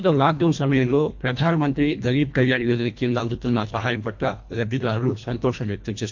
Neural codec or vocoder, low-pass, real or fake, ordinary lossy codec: codec, 24 kHz, 1.5 kbps, HILCodec; 7.2 kHz; fake; MP3, 48 kbps